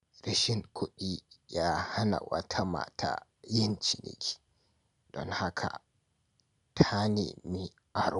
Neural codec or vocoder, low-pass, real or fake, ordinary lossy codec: none; 10.8 kHz; real; MP3, 96 kbps